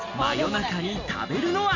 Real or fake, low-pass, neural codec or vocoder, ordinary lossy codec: real; 7.2 kHz; none; none